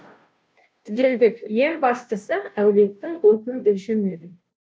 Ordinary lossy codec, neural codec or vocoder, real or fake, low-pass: none; codec, 16 kHz, 0.5 kbps, FunCodec, trained on Chinese and English, 25 frames a second; fake; none